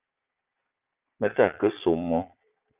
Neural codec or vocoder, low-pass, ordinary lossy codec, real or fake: vocoder, 22.05 kHz, 80 mel bands, Vocos; 3.6 kHz; Opus, 24 kbps; fake